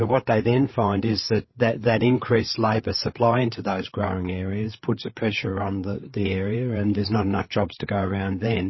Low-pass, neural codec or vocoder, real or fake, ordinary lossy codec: 7.2 kHz; codec, 16 kHz, 8 kbps, FreqCodec, larger model; fake; MP3, 24 kbps